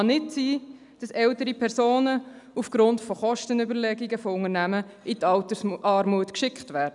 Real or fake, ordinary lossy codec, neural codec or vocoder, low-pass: real; none; none; 10.8 kHz